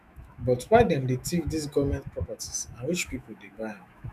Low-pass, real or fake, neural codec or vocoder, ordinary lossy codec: 14.4 kHz; fake; autoencoder, 48 kHz, 128 numbers a frame, DAC-VAE, trained on Japanese speech; none